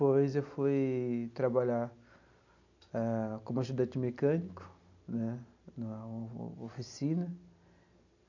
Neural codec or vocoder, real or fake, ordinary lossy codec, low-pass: none; real; none; 7.2 kHz